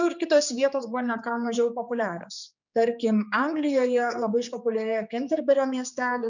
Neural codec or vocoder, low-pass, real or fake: codec, 16 kHz, 4 kbps, X-Codec, HuBERT features, trained on general audio; 7.2 kHz; fake